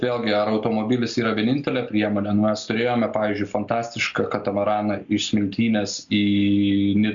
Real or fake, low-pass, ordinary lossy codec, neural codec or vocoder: real; 7.2 kHz; MP3, 64 kbps; none